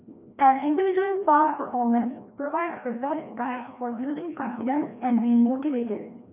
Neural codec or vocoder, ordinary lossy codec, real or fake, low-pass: codec, 16 kHz, 1 kbps, FreqCodec, larger model; none; fake; 3.6 kHz